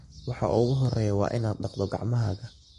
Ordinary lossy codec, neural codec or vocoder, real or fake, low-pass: MP3, 48 kbps; codec, 44.1 kHz, 7.8 kbps, Pupu-Codec; fake; 14.4 kHz